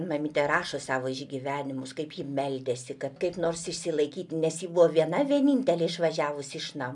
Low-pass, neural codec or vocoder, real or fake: 10.8 kHz; none; real